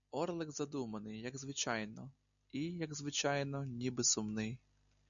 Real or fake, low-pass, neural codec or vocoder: real; 7.2 kHz; none